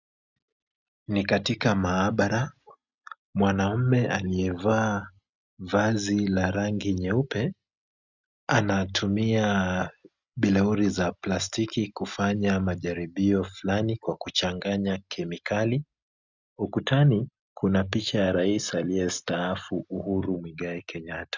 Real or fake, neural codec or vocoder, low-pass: real; none; 7.2 kHz